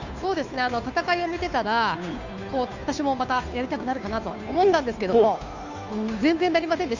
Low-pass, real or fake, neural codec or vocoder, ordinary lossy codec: 7.2 kHz; fake; codec, 16 kHz, 2 kbps, FunCodec, trained on Chinese and English, 25 frames a second; none